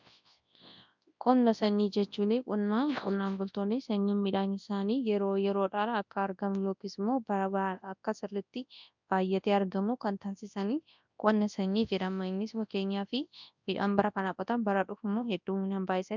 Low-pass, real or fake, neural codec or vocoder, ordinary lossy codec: 7.2 kHz; fake; codec, 24 kHz, 0.9 kbps, WavTokenizer, large speech release; MP3, 64 kbps